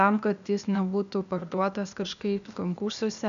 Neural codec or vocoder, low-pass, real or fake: codec, 16 kHz, 0.8 kbps, ZipCodec; 7.2 kHz; fake